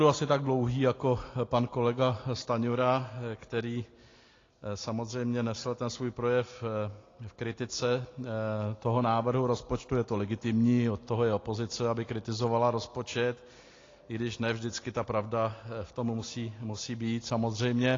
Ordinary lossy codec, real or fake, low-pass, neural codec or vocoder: AAC, 32 kbps; real; 7.2 kHz; none